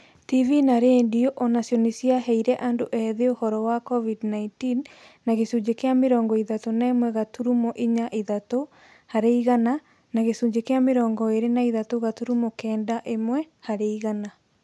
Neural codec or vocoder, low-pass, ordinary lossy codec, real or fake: none; none; none; real